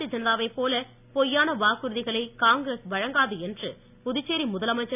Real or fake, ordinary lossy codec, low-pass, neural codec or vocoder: real; none; 3.6 kHz; none